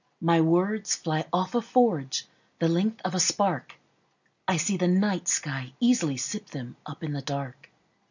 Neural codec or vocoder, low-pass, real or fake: none; 7.2 kHz; real